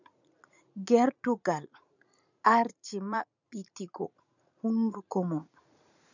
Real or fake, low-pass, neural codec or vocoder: fake; 7.2 kHz; vocoder, 44.1 kHz, 80 mel bands, Vocos